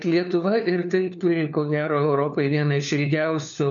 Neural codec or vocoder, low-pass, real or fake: codec, 16 kHz, 4 kbps, FunCodec, trained on LibriTTS, 50 frames a second; 7.2 kHz; fake